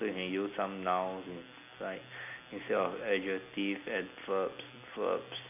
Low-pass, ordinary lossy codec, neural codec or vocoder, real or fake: 3.6 kHz; none; none; real